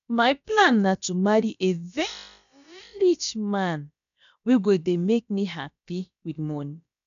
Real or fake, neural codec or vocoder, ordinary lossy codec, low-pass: fake; codec, 16 kHz, about 1 kbps, DyCAST, with the encoder's durations; none; 7.2 kHz